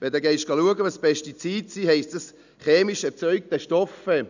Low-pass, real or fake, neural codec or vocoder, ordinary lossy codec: 7.2 kHz; real; none; none